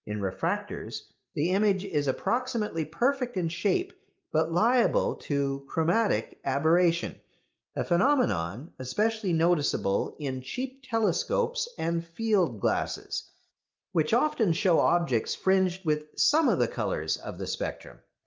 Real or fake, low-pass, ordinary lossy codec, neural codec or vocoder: real; 7.2 kHz; Opus, 24 kbps; none